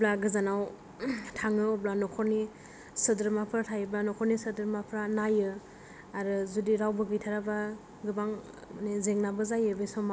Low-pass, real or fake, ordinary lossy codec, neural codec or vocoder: none; real; none; none